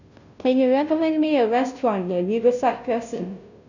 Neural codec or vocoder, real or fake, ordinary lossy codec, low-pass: codec, 16 kHz, 0.5 kbps, FunCodec, trained on Chinese and English, 25 frames a second; fake; none; 7.2 kHz